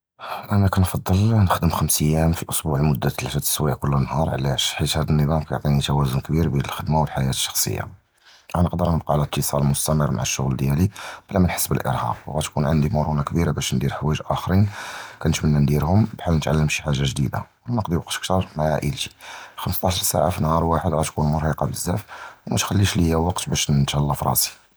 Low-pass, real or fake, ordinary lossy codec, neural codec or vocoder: none; real; none; none